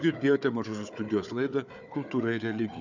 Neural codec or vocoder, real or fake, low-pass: codec, 16 kHz, 4 kbps, FunCodec, trained on Chinese and English, 50 frames a second; fake; 7.2 kHz